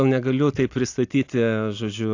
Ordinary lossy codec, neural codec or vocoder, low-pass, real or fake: AAC, 48 kbps; none; 7.2 kHz; real